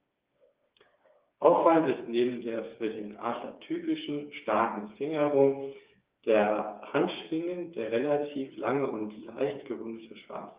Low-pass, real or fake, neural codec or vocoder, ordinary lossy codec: 3.6 kHz; fake; codec, 16 kHz, 4 kbps, FreqCodec, smaller model; Opus, 32 kbps